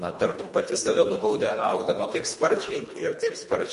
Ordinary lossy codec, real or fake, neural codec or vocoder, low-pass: MP3, 48 kbps; fake; codec, 24 kHz, 1.5 kbps, HILCodec; 10.8 kHz